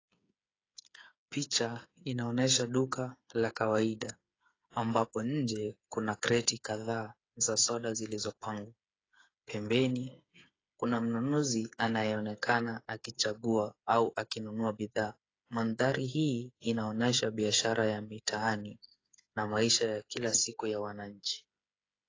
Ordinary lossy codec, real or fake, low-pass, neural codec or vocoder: AAC, 32 kbps; fake; 7.2 kHz; codec, 16 kHz, 8 kbps, FreqCodec, smaller model